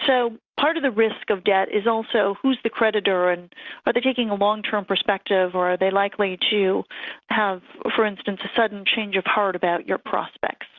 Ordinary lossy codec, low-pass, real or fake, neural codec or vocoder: Opus, 64 kbps; 7.2 kHz; real; none